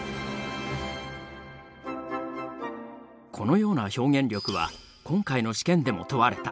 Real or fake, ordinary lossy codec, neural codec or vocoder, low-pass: real; none; none; none